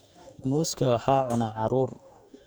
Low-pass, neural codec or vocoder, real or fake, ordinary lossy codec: none; codec, 44.1 kHz, 2.6 kbps, DAC; fake; none